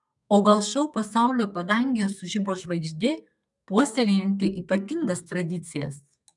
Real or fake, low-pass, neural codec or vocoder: fake; 10.8 kHz; codec, 32 kHz, 1.9 kbps, SNAC